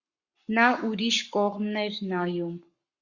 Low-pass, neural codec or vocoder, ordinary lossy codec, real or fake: 7.2 kHz; codec, 44.1 kHz, 7.8 kbps, Pupu-Codec; Opus, 64 kbps; fake